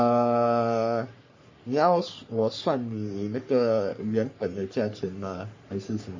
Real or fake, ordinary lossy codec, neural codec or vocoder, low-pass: fake; MP3, 32 kbps; codec, 44.1 kHz, 3.4 kbps, Pupu-Codec; 7.2 kHz